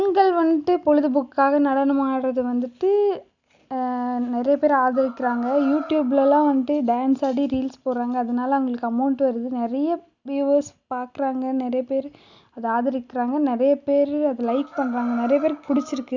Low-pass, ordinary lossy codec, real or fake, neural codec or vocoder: 7.2 kHz; none; real; none